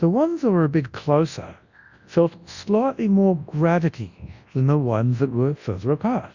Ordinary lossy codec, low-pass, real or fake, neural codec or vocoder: Opus, 64 kbps; 7.2 kHz; fake; codec, 24 kHz, 0.9 kbps, WavTokenizer, large speech release